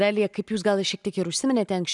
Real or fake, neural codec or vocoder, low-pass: real; none; 10.8 kHz